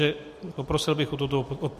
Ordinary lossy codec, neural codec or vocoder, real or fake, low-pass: MP3, 64 kbps; none; real; 14.4 kHz